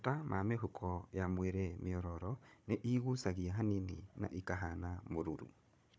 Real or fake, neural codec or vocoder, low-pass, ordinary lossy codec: fake; codec, 16 kHz, 16 kbps, FunCodec, trained on Chinese and English, 50 frames a second; none; none